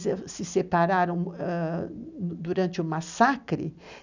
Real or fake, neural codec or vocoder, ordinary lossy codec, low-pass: real; none; none; 7.2 kHz